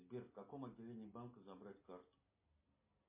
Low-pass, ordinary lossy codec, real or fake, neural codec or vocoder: 3.6 kHz; MP3, 24 kbps; real; none